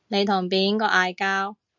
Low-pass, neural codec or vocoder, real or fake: 7.2 kHz; none; real